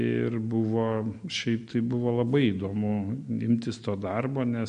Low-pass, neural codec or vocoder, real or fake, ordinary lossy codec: 9.9 kHz; none; real; MP3, 48 kbps